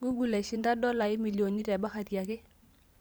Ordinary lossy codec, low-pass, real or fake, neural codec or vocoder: none; none; fake; vocoder, 44.1 kHz, 128 mel bands every 512 samples, BigVGAN v2